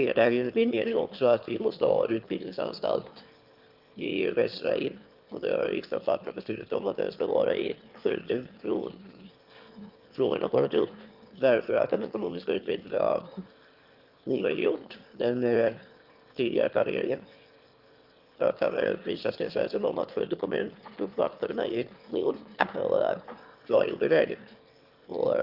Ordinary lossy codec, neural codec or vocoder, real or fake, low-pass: Opus, 24 kbps; autoencoder, 22.05 kHz, a latent of 192 numbers a frame, VITS, trained on one speaker; fake; 5.4 kHz